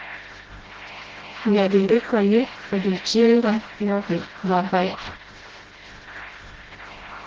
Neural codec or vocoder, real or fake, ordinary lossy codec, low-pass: codec, 16 kHz, 0.5 kbps, FreqCodec, smaller model; fake; Opus, 16 kbps; 7.2 kHz